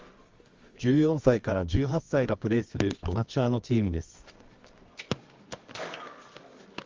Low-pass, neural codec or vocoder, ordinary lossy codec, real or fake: 7.2 kHz; codec, 24 kHz, 0.9 kbps, WavTokenizer, medium music audio release; Opus, 32 kbps; fake